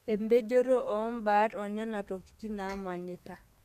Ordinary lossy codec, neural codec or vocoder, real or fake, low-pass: none; codec, 32 kHz, 1.9 kbps, SNAC; fake; 14.4 kHz